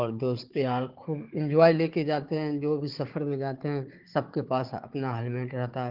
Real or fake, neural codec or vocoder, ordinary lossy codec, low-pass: fake; codec, 16 kHz, 4 kbps, FunCodec, trained on Chinese and English, 50 frames a second; Opus, 16 kbps; 5.4 kHz